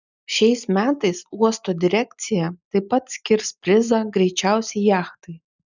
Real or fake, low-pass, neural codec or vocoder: real; 7.2 kHz; none